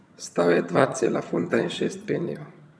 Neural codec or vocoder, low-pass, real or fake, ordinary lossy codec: vocoder, 22.05 kHz, 80 mel bands, HiFi-GAN; none; fake; none